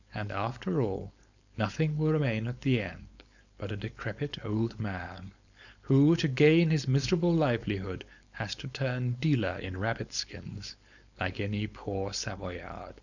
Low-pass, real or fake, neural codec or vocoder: 7.2 kHz; fake; codec, 16 kHz, 4.8 kbps, FACodec